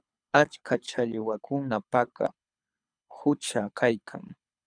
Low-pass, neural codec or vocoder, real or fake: 9.9 kHz; codec, 24 kHz, 6 kbps, HILCodec; fake